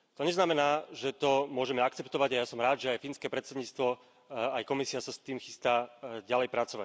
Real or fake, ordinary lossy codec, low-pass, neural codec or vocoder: real; none; none; none